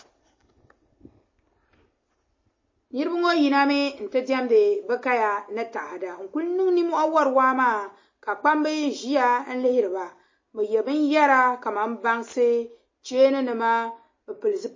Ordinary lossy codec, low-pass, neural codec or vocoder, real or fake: MP3, 32 kbps; 7.2 kHz; none; real